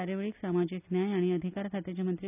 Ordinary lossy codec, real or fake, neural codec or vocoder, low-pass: none; real; none; 3.6 kHz